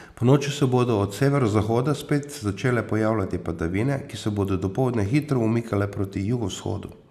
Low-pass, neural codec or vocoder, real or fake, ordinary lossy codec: 14.4 kHz; none; real; none